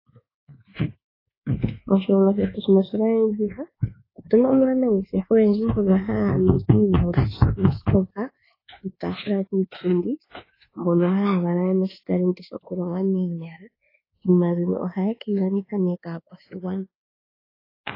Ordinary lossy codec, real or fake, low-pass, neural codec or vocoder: AAC, 24 kbps; fake; 5.4 kHz; codec, 24 kHz, 1.2 kbps, DualCodec